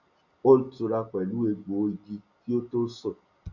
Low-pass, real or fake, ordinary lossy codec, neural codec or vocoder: 7.2 kHz; real; none; none